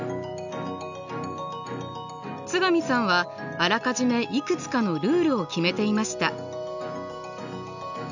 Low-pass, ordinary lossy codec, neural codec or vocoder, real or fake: 7.2 kHz; none; none; real